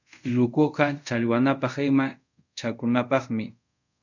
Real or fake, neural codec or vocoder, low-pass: fake; codec, 24 kHz, 0.5 kbps, DualCodec; 7.2 kHz